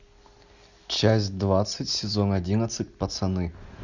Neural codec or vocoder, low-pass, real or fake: none; 7.2 kHz; real